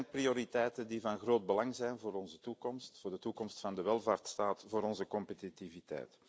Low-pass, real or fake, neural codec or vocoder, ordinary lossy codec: none; real; none; none